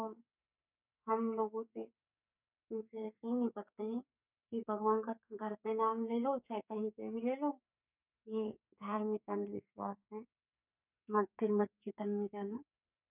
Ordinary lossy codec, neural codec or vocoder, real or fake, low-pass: none; codec, 44.1 kHz, 2.6 kbps, SNAC; fake; 3.6 kHz